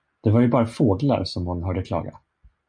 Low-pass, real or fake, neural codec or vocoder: 9.9 kHz; real; none